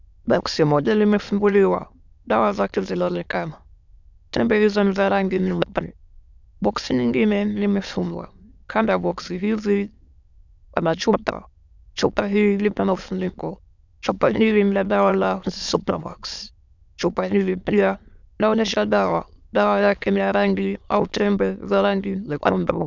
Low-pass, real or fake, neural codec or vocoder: 7.2 kHz; fake; autoencoder, 22.05 kHz, a latent of 192 numbers a frame, VITS, trained on many speakers